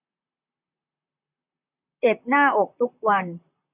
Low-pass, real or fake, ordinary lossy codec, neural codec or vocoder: 3.6 kHz; fake; none; vocoder, 44.1 kHz, 128 mel bands, Pupu-Vocoder